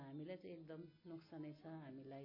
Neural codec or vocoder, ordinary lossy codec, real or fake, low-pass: none; MP3, 24 kbps; real; 5.4 kHz